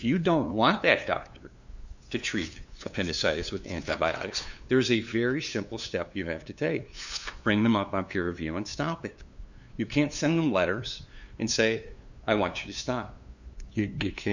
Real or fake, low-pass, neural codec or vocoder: fake; 7.2 kHz; codec, 16 kHz, 2 kbps, FunCodec, trained on LibriTTS, 25 frames a second